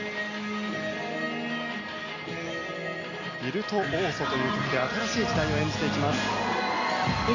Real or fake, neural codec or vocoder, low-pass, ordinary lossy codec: real; none; 7.2 kHz; none